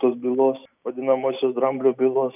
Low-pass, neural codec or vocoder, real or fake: 3.6 kHz; none; real